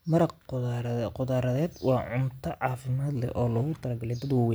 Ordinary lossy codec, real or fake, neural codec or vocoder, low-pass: none; real; none; none